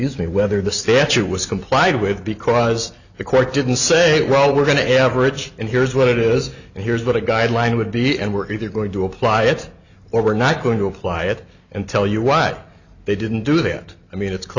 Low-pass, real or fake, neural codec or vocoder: 7.2 kHz; real; none